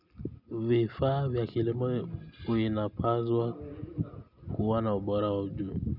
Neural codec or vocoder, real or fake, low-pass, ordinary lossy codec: none; real; 5.4 kHz; none